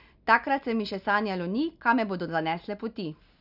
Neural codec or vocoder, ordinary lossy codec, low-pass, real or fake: none; none; 5.4 kHz; real